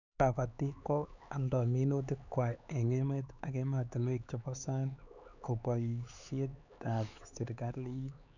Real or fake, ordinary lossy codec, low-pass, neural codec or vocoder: fake; none; 7.2 kHz; codec, 16 kHz, 4 kbps, X-Codec, HuBERT features, trained on LibriSpeech